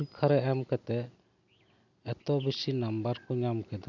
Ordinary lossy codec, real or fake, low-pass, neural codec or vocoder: none; real; 7.2 kHz; none